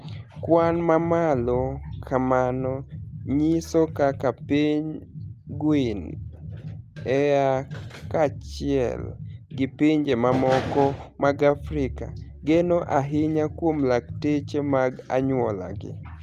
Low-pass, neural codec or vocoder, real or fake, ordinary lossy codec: 14.4 kHz; none; real; Opus, 32 kbps